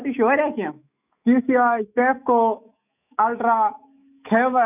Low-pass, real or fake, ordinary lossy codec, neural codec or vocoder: 3.6 kHz; fake; none; autoencoder, 48 kHz, 128 numbers a frame, DAC-VAE, trained on Japanese speech